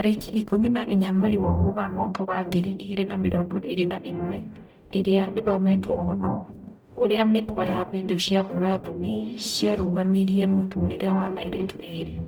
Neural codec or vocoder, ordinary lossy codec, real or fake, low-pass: codec, 44.1 kHz, 0.9 kbps, DAC; none; fake; 19.8 kHz